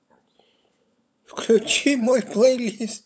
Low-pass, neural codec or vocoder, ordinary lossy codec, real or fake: none; codec, 16 kHz, 16 kbps, FunCodec, trained on LibriTTS, 50 frames a second; none; fake